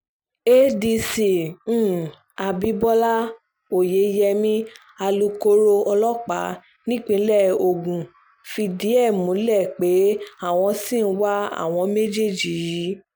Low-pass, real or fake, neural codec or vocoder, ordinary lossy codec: none; real; none; none